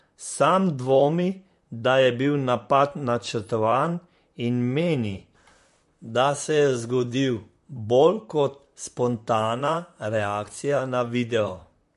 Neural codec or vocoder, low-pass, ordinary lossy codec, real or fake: vocoder, 44.1 kHz, 128 mel bands, Pupu-Vocoder; 14.4 kHz; MP3, 48 kbps; fake